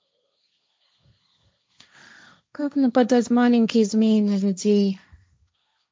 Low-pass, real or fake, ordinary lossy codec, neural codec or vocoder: none; fake; none; codec, 16 kHz, 1.1 kbps, Voila-Tokenizer